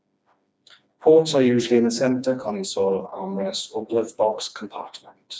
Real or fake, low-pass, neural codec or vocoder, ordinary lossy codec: fake; none; codec, 16 kHz, 2 kbps, FreqCodec, smaller model; none